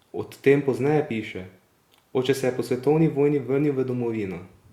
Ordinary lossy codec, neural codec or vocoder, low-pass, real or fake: Opus, 64 kbps; none; 19.8 kHz; real